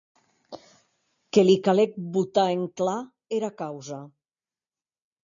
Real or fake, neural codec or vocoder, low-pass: real; none; 7.2 kHz